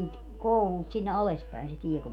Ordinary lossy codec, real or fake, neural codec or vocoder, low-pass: none; fake; autoencoder, 48 kHz, 128 numbers a frame, DAC-VAE, trained on Japanese speech; 19.8 kHz